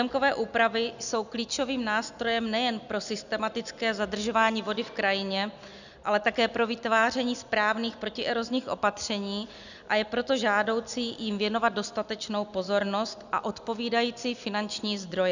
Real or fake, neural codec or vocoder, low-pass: real; none; 7.2 kHz